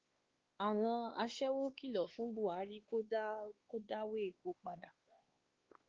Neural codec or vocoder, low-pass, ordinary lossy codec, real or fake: codec, 16 kHz, 2 kbps, X-Codec, WavLM features, trained on Multilingual LibriSpeech; 7.2 kHz; Opus, 16 kbps; fake